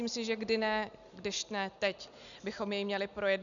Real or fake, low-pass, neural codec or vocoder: real; 7.2 kHz; none